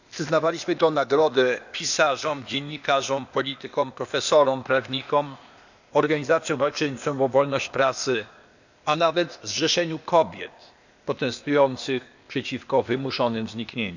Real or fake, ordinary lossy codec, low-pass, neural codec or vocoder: fake; none; 7.2 kHz; codec, 16 kHz, 0.8 kbps, ZipCodec